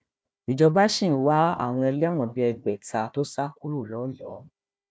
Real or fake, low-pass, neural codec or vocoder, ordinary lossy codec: fake; none; codec, 16 kHz, 1 kbps, FunCodec, trained on Chinese and English, 50 frames a second; none